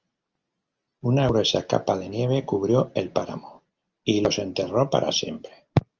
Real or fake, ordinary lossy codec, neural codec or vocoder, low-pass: real; Opus, 24 kbps; none; 7.2 kHz